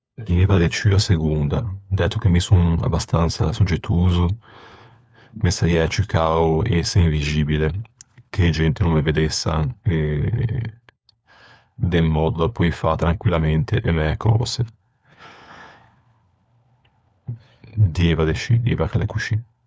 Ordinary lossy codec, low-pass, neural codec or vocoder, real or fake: none; none; codec, 16 kHz, 4 kbps, FunCodec, trained on LibriTTS, 50 frames a second; fake